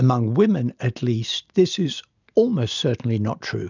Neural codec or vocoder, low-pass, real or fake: none; 7.2 kHz; real